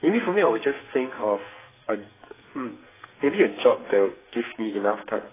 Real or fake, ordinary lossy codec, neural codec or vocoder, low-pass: fake; AAC, 16 kbps; codec, 44.1 kHz, 2.6 kbps, SNAC; 3.6 kHz